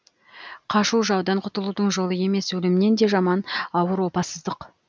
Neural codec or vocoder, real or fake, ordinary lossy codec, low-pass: none; real; none; none